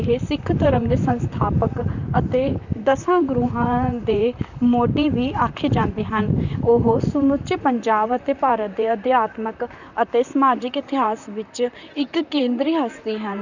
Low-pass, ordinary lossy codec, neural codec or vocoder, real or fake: 7.2 kHz; none; vocoder, 44.1 kHz, 128 mel bands, Pupu-Vocoder; fake